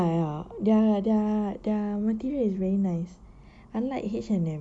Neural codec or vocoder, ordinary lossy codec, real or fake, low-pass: none; none; real; 9.9 kHz